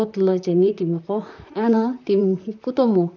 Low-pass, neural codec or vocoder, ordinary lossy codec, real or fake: 7.2 kHz; vocoder, 44.1 kHz, 128 mel bands, Pupu-Vocoder; none; fake